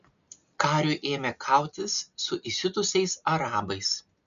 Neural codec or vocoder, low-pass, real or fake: none; 7.2 kHz; real